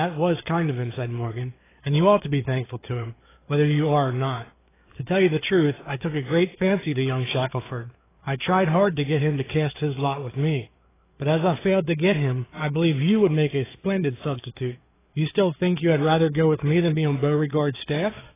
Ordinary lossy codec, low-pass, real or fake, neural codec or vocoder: AAC, 16 kbps; 3.6 kHz; fake; codec, 16 kHz, 4 kbps, FreqCodec, larger model